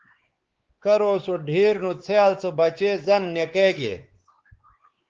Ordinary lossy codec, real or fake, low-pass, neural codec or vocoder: Opus, 16 kbps; fake; 7.2 kHz; codec, 16 kHz, 4 kbps, X-Codec, WavLM features, trained on Multilingual LibriSpeech